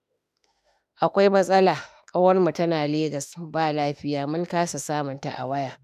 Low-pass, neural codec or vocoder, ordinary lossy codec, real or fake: 14.4 kHz; autoencoder, 48 kHz, 32 numbers a frame, DAC-VAE, trained on Japanese speech; none; fake